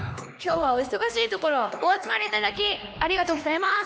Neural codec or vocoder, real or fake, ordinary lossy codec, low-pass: codec, 16 kHz, 2 kbps, X-Codec, HuBERT features, trained on LibriSpeech; fake; none; none